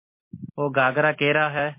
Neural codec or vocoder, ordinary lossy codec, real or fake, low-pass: none; MP3, 24 kbps; real; 3.6 kHz